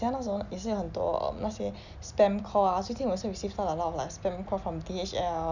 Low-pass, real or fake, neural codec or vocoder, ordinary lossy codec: 7.2 kHz; real; none; none